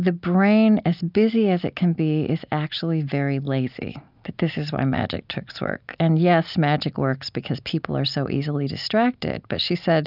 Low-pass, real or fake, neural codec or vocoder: 5.4 kHz; real; none